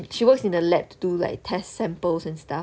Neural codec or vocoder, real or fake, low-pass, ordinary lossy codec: none; real; none; none